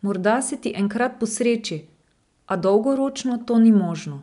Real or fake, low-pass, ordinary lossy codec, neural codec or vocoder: real; 10.8 kHz; none; none